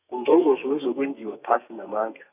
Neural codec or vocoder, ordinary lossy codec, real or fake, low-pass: codec, 32 kHz, 1.9 kbps, SNAC; none; fake; 3.6 kHz